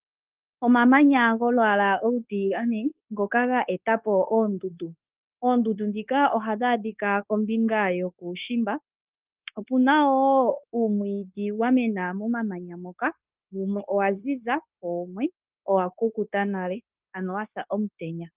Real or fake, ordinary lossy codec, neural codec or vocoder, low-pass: fake; Opus, 32 kbps; codec, 16 kHz, 0.9 kbps, LongCat-Audio-Codec; 3.6 kHz